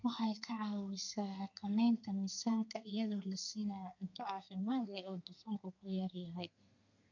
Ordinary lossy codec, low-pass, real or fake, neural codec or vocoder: none; 7.2 kHz; fake; codec, 32 kHz, 1.9 kbps, SNAC